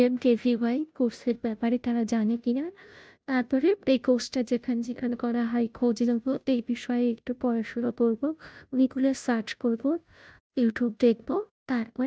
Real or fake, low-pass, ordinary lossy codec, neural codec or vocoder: fake; none; none; codec, 16 kHz, 0.5 kbps, FunCodec, trained on Chinese and English, 25 frames a second